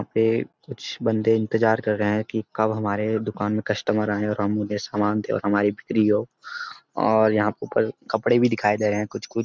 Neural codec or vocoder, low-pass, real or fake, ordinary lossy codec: none; none; real; none